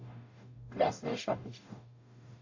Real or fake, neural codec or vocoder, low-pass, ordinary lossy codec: fake; codec, 44.1 kHz, 0.9 kbps, DAC; 7.2 kHz; AAC, 48 kbps